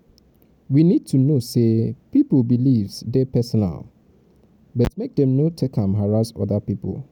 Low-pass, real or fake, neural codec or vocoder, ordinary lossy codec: 19.8 kHz; real; none; none